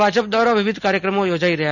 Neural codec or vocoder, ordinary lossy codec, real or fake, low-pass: none; none; real; 7.2 kHz